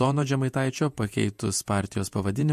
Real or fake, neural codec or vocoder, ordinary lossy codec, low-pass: fake; vocoder, 48 kHz, 128 mel bands, Vocos; MP3, 64 kbps; 14.4 kHz